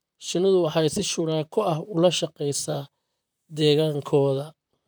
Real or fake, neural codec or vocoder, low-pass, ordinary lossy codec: fake; vocoder, 44.1 kHz, 128 mel bands, Pupu-Vocoder; none; none